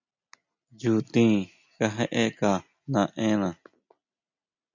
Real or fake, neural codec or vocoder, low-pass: real; none; 7.2 kHz